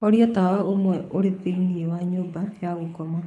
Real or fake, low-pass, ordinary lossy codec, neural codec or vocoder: fake; none; none; codec, 24 kHz, 6 kbps, HILCodec